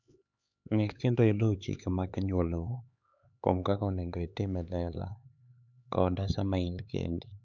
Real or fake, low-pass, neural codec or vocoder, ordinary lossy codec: fake; 7.2 kHz; codec, 16 kHz, 4 kbps, X-Codec, HuBERT features, trained on LibriSpeech; none